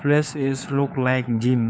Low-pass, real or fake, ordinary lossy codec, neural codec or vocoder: none; fake; none; codec, 16 kHz, 4 kbps, FunCodec, trained on LibriTTS, 50 frames a second